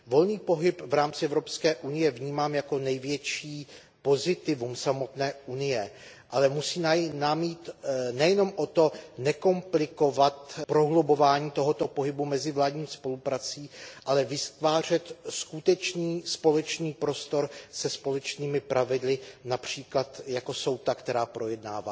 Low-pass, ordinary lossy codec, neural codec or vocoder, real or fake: none; none; none; real